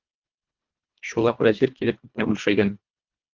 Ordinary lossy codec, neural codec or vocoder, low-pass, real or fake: Opus, 32 kbps; codec, 24 kHz, 1.5 kbps, HILCodec; 7.2 kHz; fake